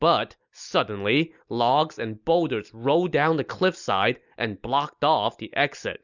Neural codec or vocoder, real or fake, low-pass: none; real; 7.2 kHz